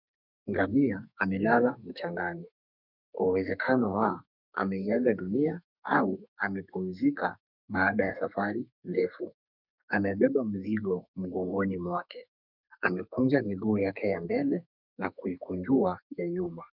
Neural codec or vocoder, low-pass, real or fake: codec, 32 kHz, 1.9 kbps, SNAC; 5.4 kHz; fake